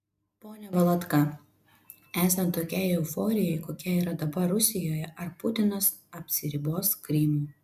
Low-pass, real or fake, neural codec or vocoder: 14.4 kHz; real; none